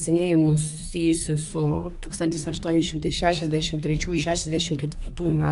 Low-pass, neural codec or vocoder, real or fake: 10.8 kHz; codec, 24 kHz, 1 kbps, SNAC; fake